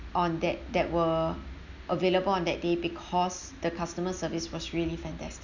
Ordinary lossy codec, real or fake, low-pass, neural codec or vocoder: none; real; 7.2 kHz; none